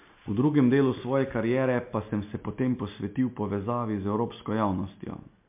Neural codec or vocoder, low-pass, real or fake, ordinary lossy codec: none; 3.6 kHz; real; MP3, 32 kbps